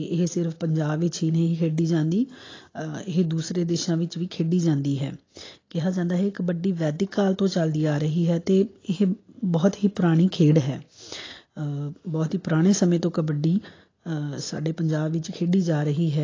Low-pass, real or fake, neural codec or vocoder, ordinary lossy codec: 7.2 kHz; real; none; AAC, 32 kbps